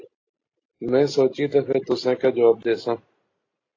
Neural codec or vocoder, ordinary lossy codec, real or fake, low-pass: none; AAC, 32 kbps; real; 7.2 kHz